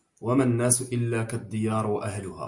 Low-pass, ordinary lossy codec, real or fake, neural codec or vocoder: 10.8 kHz; Opus, 64 kbps; real; none